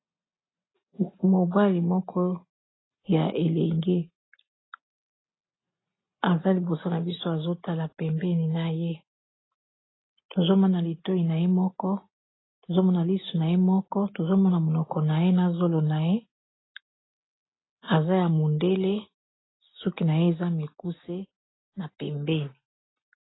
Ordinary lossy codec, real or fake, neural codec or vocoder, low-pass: AAC, 16 kbps; real; none; 7.2 kHz